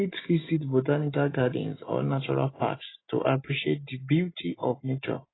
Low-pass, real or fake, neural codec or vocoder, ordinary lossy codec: 7.2 kHz; real; none; AAC, 16 kbps